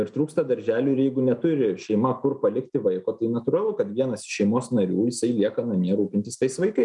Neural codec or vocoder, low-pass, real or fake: none; 10.8 kHz; real